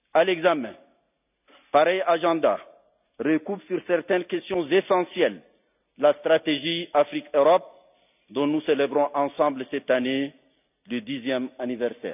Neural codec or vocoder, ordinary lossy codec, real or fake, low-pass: none; none; real; 3.6 kHz